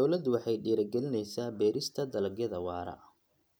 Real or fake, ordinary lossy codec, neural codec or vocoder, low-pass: real; none; none; none